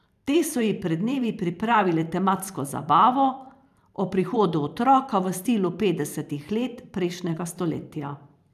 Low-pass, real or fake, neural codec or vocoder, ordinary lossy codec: 14.4 kHz; fake; vocoder, 44.1 kHz, 128 mel bands every 512 samples, BigVGAN v2; none